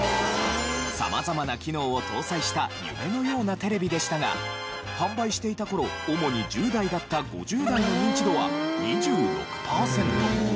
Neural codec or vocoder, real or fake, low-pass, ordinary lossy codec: none; real; none; none